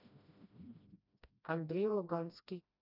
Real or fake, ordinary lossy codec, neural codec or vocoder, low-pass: fake; none; codec, 16 kHz, 1 kbps, FreqCodec, smaller model; 5.4 kHz